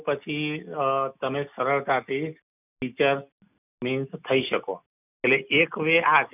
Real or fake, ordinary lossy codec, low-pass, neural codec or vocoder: real; none; 3.6 kHz; none